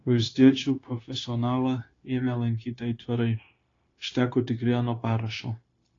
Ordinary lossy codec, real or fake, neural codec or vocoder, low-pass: AAC, 32 kbps; fake; codec, 16 kHz, 0.9 kbps, LongCat-Audio-Codec; 7.2 kHz